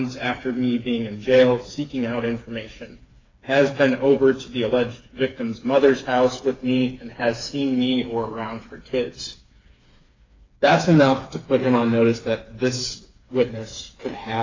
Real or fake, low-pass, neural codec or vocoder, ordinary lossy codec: fake; 7.2 kHz; codec, 16 kHz, 4 kbps, FreqCodec, smaller model; AAC, 32 kbps